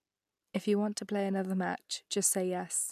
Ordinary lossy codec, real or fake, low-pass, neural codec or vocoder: none; real; 14.4 kHz; none